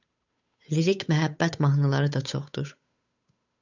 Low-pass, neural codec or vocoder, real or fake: 7.2 kHz; codec, 16 kHz, 8 kbps, FunCodec, trained on Chinese and English, 25 frames a second; fake